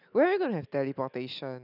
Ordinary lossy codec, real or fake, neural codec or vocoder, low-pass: none; real; none; 5.4 kHz